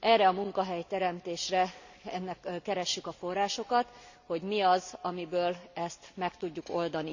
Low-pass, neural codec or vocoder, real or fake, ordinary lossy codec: 7.2 kHz; none; real; none